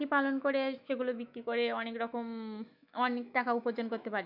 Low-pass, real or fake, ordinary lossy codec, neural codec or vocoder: 5.4 kHz; fake; none; autoencoder, 48 kHz, 128 numbers a frame, DAC-VAE, trained on Japanese speech